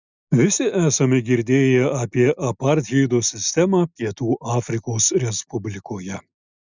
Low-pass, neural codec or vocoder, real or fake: 7.2 kHz; none; real